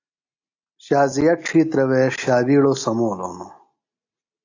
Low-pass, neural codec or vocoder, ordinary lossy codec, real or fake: 7.2 kHz; none; AAC, 48 kbps; real